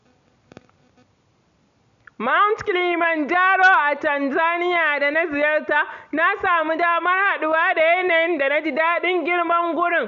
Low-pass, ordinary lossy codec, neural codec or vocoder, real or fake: 7.2 kHz; none; none; real